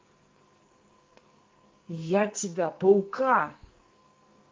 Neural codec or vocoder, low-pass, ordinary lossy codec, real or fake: codec, 44.1 kHz, 2.6 kbps, SNAC; 7.2 kHz; Opus, 32 kbps; fake